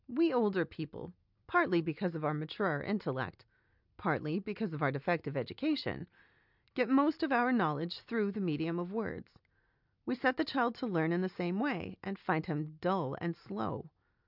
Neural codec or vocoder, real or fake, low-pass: none; real; 5.4 kHz